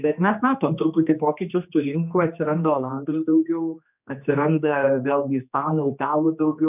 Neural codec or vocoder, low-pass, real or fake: codec, 16 kHz, 2 kbps, X-Codec, HuBERT features, trained on general audio; 3.6 kHz; fake